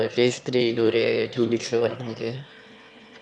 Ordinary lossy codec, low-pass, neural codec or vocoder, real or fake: none; none; autoencoder, 22.05 kHz, a latent of 192 numbers a frame, VITS, trained on one speaker; fake